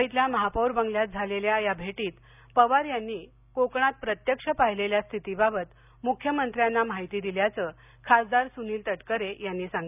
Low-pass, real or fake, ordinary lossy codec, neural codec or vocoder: 3.6 kHz; real; none; none